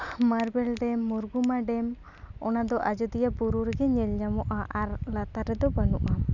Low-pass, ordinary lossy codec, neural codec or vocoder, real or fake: 7.2 kHz; none; none; real